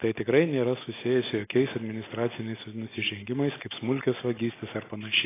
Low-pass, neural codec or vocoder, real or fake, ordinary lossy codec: 3.6 kHz; none; real; AAC, 16 kbps